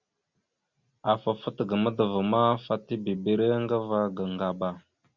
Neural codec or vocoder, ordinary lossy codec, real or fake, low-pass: none; Opus, 64 kbps; real; 7.2 kHz